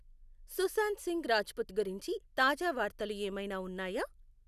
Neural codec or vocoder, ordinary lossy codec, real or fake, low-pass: none; none; real; 14.4 kHz